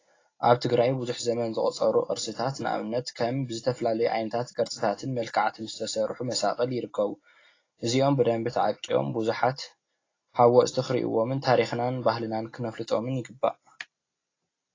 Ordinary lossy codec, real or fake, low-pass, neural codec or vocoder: AAC, 32 kbps; real; 7.2 kHz; none